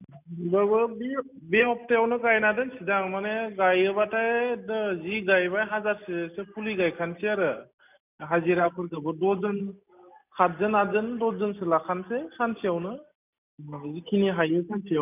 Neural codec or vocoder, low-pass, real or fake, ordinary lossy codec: none; 3.6 kHz; real; none